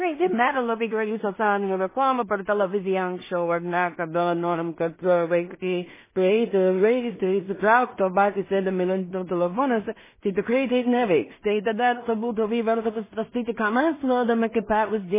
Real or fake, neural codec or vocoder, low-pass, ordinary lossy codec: fake; codec, 16 kHz in and 24 kHz out, 0.4 kbps, LongCat-Audio-Codec, two codebook decoder; 3.6 kHz; MP3, 16 kbps